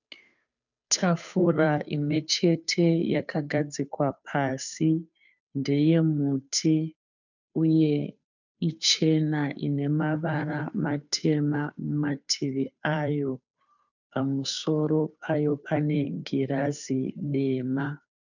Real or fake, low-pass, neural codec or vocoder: fake; 7.2 kHz; codec, 16 kHz, 2 kbps, FunCodec, trained on Chinese and English, 25 frames a second